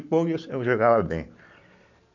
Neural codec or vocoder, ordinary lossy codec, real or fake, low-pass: none; none; real; 7.2 kHz